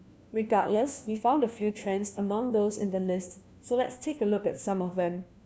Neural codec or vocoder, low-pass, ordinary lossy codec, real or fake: codec, 16 kHz, 1 kbps, FunCodec, trained on LibriTTS, 50 frames a second; none; none; fake